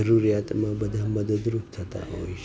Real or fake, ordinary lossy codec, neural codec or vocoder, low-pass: real; none; none; none